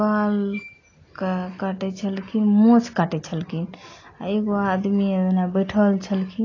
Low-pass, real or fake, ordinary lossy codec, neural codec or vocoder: 7.2 kHz; real; AAC, 32 kbps; none